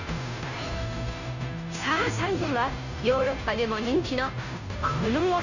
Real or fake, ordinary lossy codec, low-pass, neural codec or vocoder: fake; none; 7.2 kHz; codec, 16 kHz, 0.5 kbps, FunCodec, trained on Chinese and English, 25 frames a second